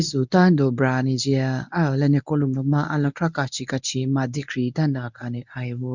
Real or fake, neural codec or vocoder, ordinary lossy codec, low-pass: fake; codec, 24 kHz, 0.9 kbps, WavTokenizer, medium speech release version 1; none; 7.2 kHz